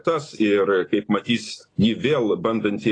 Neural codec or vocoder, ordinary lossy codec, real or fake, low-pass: none; AAC, 32 kbps; real; 9.9 kHz